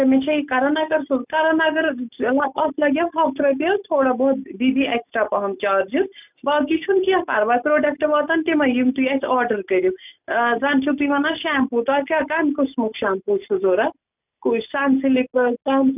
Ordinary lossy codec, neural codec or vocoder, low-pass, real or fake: none; none; 3.6 kHz; real